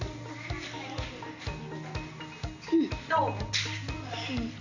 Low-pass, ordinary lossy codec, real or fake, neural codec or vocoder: 7.2 kHz; none; real; none